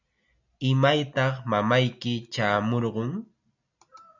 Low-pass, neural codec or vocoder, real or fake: 7.2 kHz; none; real